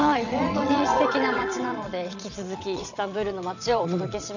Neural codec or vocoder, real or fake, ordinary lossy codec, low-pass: vocoder, 22.05 kHz, 80 mel bands, WaveNeXt; fake; none; 7.2 kHz